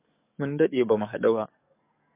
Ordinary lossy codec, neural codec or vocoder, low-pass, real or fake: MP3, 24 kbps; codec, 44.1 kHz, 7.8 kbps, DAC; 3.6 kHz; fake